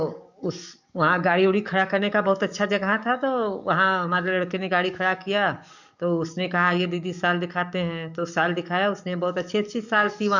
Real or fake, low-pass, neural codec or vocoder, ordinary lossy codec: fake; 7.2 kHz; codec, 44.1 kHz, 7.8 kbps, DAC; none